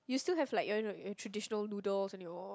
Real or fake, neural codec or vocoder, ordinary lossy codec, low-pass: real; none; none; none